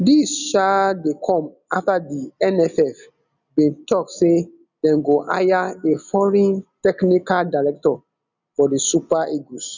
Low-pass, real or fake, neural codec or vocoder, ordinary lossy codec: 7.2 kHz; real; none; none